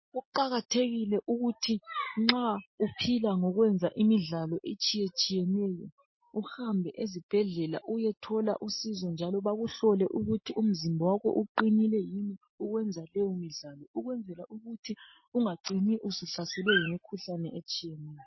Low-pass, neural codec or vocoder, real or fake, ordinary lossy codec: 7.2 kHz; none; real; MP3, 24 kbps